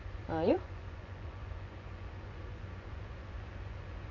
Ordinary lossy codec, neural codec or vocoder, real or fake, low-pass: MP3, 64 kbps; vocoder, 24 kHz, 100 mel bands, Vocos; fake; 7.2 kHz